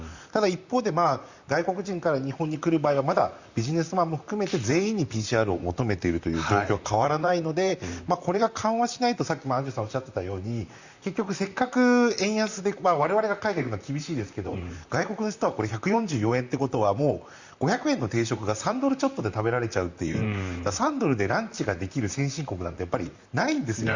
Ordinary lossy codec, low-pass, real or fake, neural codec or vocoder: Opus, 64 kbps; 7.2 kHz; fake; vocoder, 44.1 kHz, 128 mel bands, Pupu-Vocoder